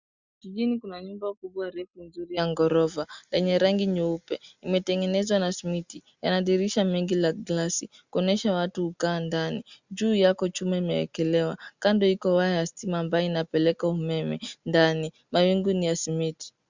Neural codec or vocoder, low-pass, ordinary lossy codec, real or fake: none; 7.2 kHz; Opus, 64 kbps; real